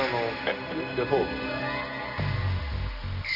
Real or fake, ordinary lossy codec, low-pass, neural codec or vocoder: real; none; 5.4 kHz; none